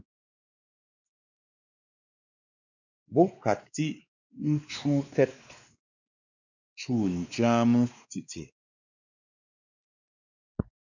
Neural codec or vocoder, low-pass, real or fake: codec, 16 kHz, 2 kbps, X-Codec, WavLM features, trained on Multilingual LibriSpeech; 7.2 kHz; fake